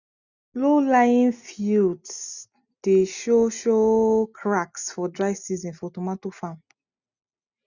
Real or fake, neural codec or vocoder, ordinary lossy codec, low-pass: real; none; none; 7.2 kHz